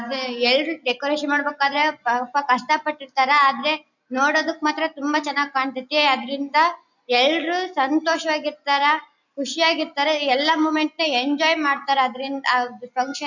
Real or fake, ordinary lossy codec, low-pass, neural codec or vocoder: real; none; 7.2 kHz; none